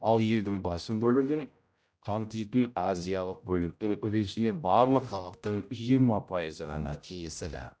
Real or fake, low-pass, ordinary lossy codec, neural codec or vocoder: fake; none; none; codec, 16 kHz, 0.5 kbps, X-Codec, HuBERT features, trained on general audio